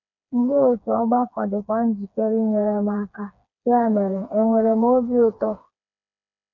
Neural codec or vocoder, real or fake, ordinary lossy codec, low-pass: codec, 16 kHz, 4 kbps, FreqCodec, smaller model; fake; none; 7.2 kHz